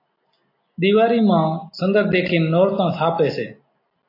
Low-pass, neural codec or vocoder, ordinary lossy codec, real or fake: 5.4 kHz; none; AAC, 32 kbps; real